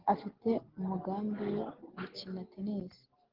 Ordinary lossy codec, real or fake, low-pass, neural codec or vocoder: Opus, 16 kbps; real; 5.4 kHz; none